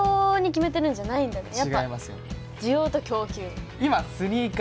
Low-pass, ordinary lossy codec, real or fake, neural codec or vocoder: none; none; real; none